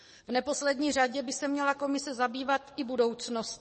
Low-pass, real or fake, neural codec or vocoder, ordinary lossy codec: 10.8 kHz; fake; codec, 44.1 kHz, 7.8 kbps, DAC; MP3, 32 kbps